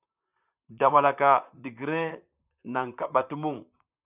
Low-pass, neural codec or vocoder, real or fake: 3.6 kHz; vocoder, 44.1 kHz, 128 mel bands, Pupu-Vocoder; fake